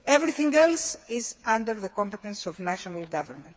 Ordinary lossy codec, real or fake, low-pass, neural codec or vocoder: none; fake; none; codec, 16 kHz, 4 kbps, FreqCodec, smaller model